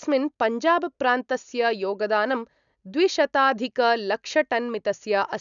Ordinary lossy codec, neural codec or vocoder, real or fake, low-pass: none; none; real; 7.2 kHz